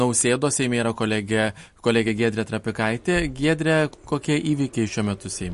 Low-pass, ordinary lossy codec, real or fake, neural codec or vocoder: 14.4 kHz; MP3, 48 kbps; real; none